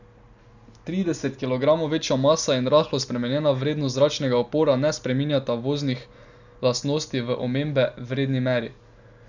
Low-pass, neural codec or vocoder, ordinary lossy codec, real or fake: 7.2 kHz; none; none; real